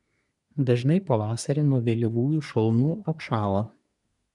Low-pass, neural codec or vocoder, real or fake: 10.8 kHz; codec, 24 kHz, 1 kbps, SNAC; fake